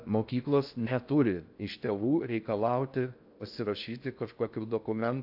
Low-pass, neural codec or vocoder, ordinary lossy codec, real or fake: 5.4 kHz; codec, 16 kHz in and 24 kHz out, 0.6 kbps, FocalCodec, streaming, 4096 codes; AAC, 48 kbps; fake